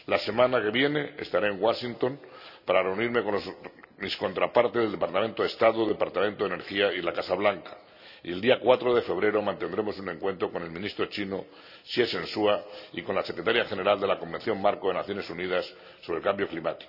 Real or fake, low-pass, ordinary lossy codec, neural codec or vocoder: real; 5.4 kHz; none; none